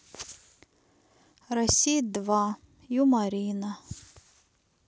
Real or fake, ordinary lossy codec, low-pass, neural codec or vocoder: real; none; none; none